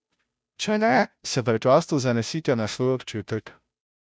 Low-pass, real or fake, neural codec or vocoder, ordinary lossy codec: none; fake; codec, 16 kHz, 0.5 kbps, FunCodec, trained on Chinese and English, 25 frames a second; none